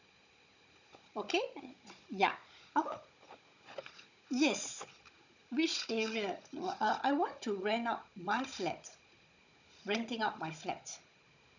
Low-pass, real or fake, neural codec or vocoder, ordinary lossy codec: 7.2 kHz; fake; codec, 16 kHz, 16 kbps, FunCodec, trained on Chinese and English, 50 frames a second; none